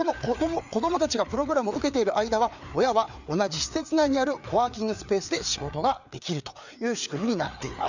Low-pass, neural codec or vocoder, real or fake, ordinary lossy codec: 7.2 kHz; codec, 16 kHz, 4 kbps, FreqCodec, larger model; fake; none